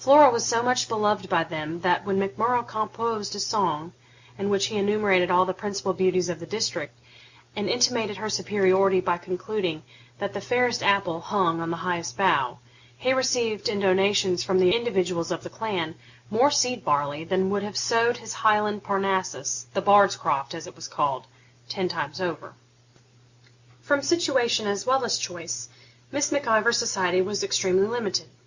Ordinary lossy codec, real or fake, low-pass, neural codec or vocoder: Opus, 64 kbps; real; 7.2 kHz; none